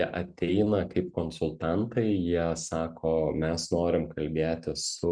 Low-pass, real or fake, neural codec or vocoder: 9.9 kHz; real; none